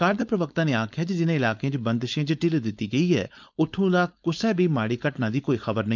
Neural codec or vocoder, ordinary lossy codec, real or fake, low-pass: codec, 16 kHz, 4.8 kbps, FACodec; none; fake; 7.2 kHz